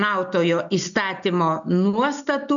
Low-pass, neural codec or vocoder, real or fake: 7.2 kHz; none; real